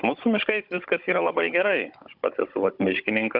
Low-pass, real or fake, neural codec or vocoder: 5.4 kHz; fake; codec, 16 kHz, 16 kbps, FunCodec, trained on Chinese and English, 50 frames a second